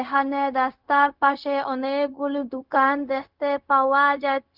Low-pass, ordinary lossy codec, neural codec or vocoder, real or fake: 5.4 kHz; Opus, 24 kbps; codec, 16 kHz, 0.4 kbps, LongCat-Audio-Codec; fake